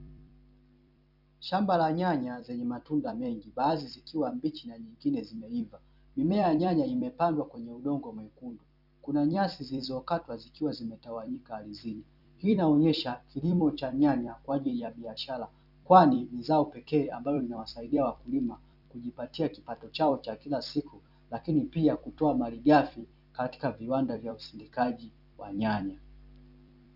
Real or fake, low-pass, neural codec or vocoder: fake; 5.4 kHz; vocoder, 44.1 kHz, 128 mel bands every 256 samples, BigVGAN v2